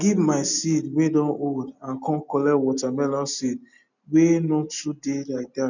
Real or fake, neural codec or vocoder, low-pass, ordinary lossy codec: real; none; 7.2 kHz; none